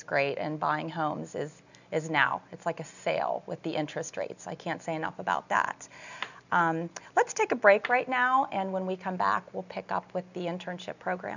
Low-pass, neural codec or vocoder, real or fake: 7.2 kHz; none; real